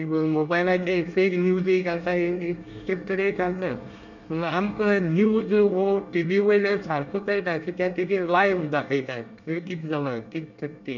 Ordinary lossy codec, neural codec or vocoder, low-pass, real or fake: none; codec, 24 kHz, 1 kbps, SNAC; 7.2 kHz; fake